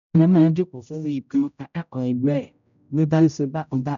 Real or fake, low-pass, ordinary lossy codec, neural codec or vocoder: fake; 7.2 kHz; none; codec, 16 kHz, 0.5 kbps, X-Codec, HuBERT features, trained on general audio